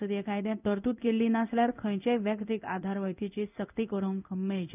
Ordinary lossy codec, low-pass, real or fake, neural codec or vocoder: none; 3.6 kHz; fake; codec, 16 kHz in and 24 kHz out, 1 kbps, XY-Tokenizer